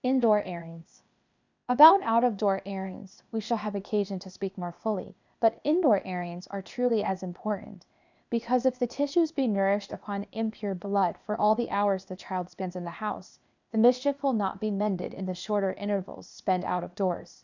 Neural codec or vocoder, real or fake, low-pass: codec, 16 kHz, 0.8 kbps, ZipCodec; fake; 7.2 kHz